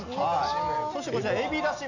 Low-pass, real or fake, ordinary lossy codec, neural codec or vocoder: 7.2 kHz; real; none; none